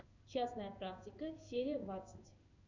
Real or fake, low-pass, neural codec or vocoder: fake; 7.2 kHz; codec, 16 kHz in and 24 kHz out, 1 kbps, XY-Tokenizer